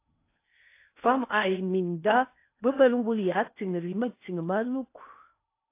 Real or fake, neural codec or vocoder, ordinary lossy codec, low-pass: fake; codec, 16 kHz in and 24 kHz out, 0.6 kbps, FocalCodec, streaming, 2048 codes; AAC, 24 kbps; 3.6 kHz